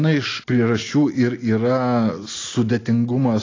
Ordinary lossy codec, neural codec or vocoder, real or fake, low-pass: AAC, 32 kbps; none; real; 7.2 kHz